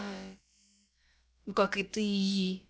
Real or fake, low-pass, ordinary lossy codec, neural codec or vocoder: fake; none; none; codec, 16 kHz, about 1 kbps, DyCAST, with the encoder's durations